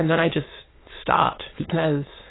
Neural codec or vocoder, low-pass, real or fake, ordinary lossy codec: autoencoder, 22.05 kHz, a latent of 192 numbers a frame, VITS, trained on many speakers; 7.2 kHz; fake; AAC, 16 kbps